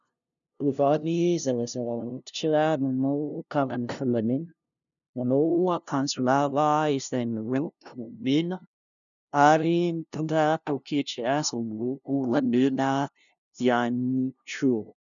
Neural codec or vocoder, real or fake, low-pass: codec, 16 kHz, 0.5 kbps, FunCodec, trained on LibriTTS, 25 frames a second; fake; 7.2 kHz